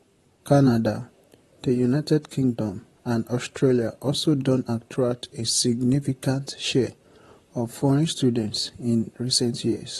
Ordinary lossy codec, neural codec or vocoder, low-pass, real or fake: AAC, 32 kbps; vocoder, 44.1 kHz, 128 mel bands, Pupu-Vocoder; 19.8 kHz; fake